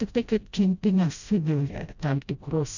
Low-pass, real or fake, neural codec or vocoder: 7.2 kHz; fake; codec, 16 kHz, 0.5 kbps, FreqCodec, smaller model